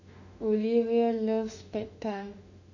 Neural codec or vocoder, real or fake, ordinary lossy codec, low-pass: autoencoder, 48 kHz, 32 numbers a frame, DAC-VAE, trained on Japanese speech; fake; none; 7.2 kHz